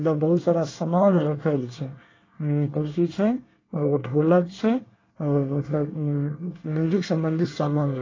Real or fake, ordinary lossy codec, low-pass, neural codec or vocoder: fake; AAC, 32 kbps; 7.2 kHz; codec, 24 kHz, 1 kbps, SNAC